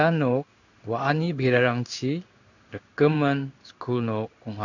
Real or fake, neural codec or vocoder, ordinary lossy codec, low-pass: fake; codec, 16 kHz in and 24 kHz out, 1 kbps, XY-Tokenizer; none; 7.2 kHz